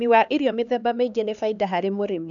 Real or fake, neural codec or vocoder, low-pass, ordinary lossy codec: fake; codec, 16 kHz, 2 kbps, X-Codec, HuBERT features, trained on LibriSpeech; 7.2 kHz; none